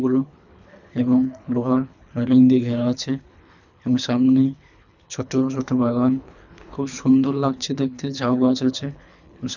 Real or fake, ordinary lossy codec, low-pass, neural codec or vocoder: fake; none; 7.2 kHz; codec, 24 kHz, 3 kbps, HILCodec